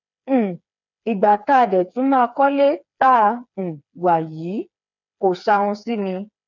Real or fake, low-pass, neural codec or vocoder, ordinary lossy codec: fake; 7.2 kHz; codec, 16 kHz, 4 kbps, FreqCodec, smaller model; none